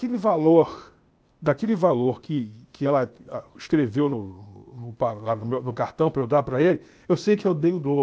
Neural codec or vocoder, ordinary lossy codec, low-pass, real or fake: codec, 16 kHz, 0.8 kbps, ZipCodec; none; none; fake